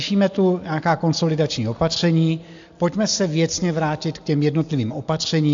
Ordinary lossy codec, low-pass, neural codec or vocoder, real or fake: AAC, 64 kbps; 7.2 kHz; none; real